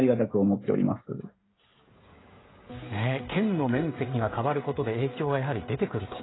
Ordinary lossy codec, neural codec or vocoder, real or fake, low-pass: AAC, 16 kbps; codec, 16 kHz, 16 kbps, FreqCodec, smaller model; fake; 7.2 kHz